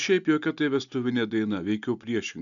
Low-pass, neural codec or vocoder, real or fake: 7.2 kHz; none; real